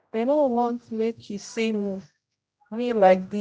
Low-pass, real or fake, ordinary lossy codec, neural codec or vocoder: none; fake; none; codec, 16 kHz, 0.5 kbps, X-Codec, HuBERT features, trained on general audio